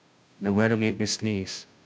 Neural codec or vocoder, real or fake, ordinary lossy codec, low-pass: codec, 16 kHz, 0.5 kbps, FunCodec, trained on Chinese and English, 25 frames a second; fake; none; none